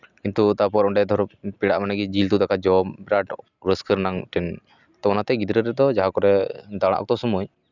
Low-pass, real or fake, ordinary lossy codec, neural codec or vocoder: 7.2 kHz; real; none; none